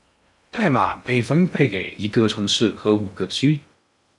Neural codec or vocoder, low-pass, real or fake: codec, 16 kHz in and 24 kHz out, 0.6 kbps, FocalCodec, streaming, 2048 codes; 10.8 kHz; fake